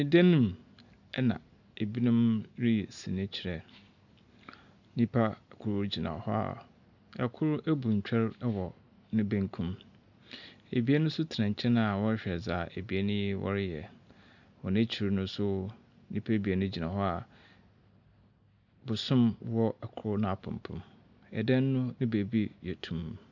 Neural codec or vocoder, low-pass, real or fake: none; 7.2 kHz; real